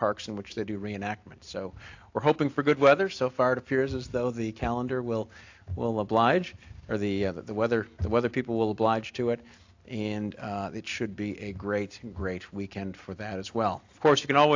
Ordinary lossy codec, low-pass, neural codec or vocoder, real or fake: AAC, 48 kbps; 7.2 kHz; none; real